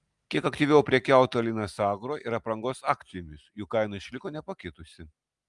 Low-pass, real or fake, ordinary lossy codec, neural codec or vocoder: 10.8 kHz; real; Opus, 32 kbps; none